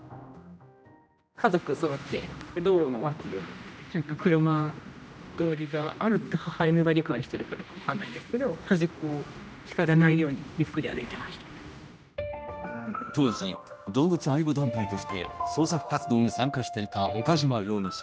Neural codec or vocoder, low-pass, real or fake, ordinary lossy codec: codec, 16 kHz, 1 kbps, X-Codec, HuBERT features, trained on general audio; none; fake; none